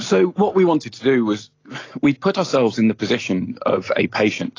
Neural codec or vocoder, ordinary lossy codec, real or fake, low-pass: none; AAC, 32 kbps; real; 7.2 kHz